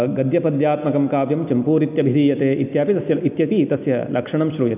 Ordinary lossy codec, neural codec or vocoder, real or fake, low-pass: none; none; real; 3.6 kHz